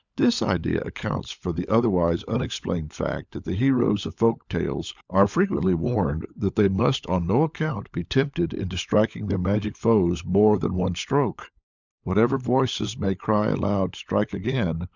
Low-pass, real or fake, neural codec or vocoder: 7.2 kHz; fake; codec, 16 kHz, 16 kbps, FunCodec, trained on LibriTTS, 50 frames a second